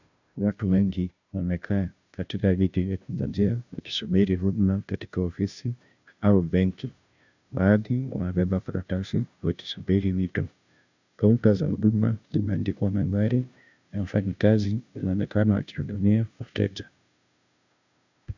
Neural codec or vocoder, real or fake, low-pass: codec, 16 kHz, 0.5 kbps, FunCodec, trained on Chinese and English, 25 frames a second; fake; 7.2 kHz